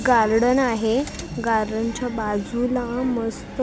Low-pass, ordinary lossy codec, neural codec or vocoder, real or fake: none; none; none; real